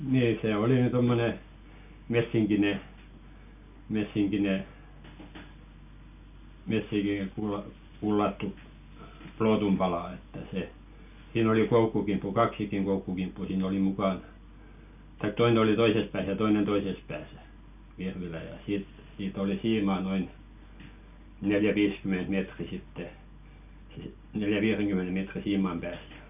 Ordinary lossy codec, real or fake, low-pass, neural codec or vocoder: none; real; 3.6 kHz; none